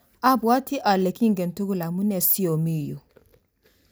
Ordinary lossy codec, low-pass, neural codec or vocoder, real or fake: none; none; none; real